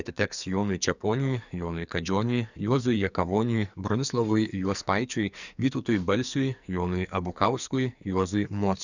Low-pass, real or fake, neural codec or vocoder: 7.2 kHz; fake; codec, 44.1 kHz, 2.6 kbps, SNAC